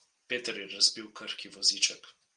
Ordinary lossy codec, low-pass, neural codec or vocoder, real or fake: Opus, 24 kbps; 9.9 kHz; none; real